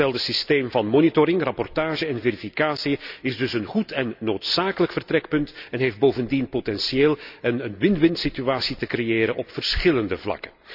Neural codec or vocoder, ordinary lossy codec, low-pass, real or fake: none; none; 5.4 kHz; real